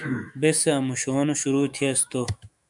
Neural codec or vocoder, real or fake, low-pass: autoencoder, 48 kHz, 128 numbers a frame, DAC-VAE, trained on Japanese speech; fake; 10.8 kHz